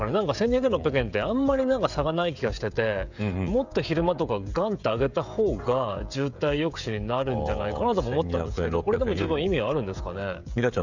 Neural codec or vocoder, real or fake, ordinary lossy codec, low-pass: codec, 16 kHz, 16 kbps, FreqCodec, smaller model; fake; none; 7.2 kHz